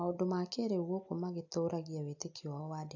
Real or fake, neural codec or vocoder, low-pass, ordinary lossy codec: real; none; 7.2 kHz; AAC, 48 kbps